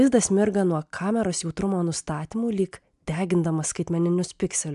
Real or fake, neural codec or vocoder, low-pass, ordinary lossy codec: real; none; 10.8 kHz; MP3, 96 kbps